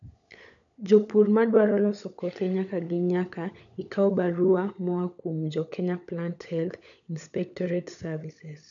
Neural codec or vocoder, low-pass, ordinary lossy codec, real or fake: codec, 16 kHz, 16 kbps, FunCodec, trained on LibriTTS, 50 frames a second; 7.2 kHz; none; fake